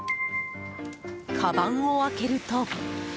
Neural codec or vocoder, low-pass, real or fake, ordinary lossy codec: none; none; real; none